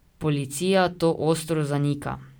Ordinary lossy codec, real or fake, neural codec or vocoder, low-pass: none; real; none; none